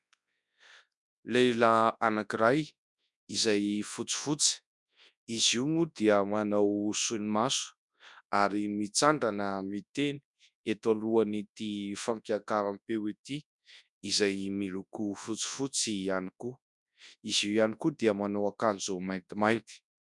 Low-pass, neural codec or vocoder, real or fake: 10.8 kHz; codec, 24 kHz, 0.9 kbps, WavTokenizer, large speech release; fake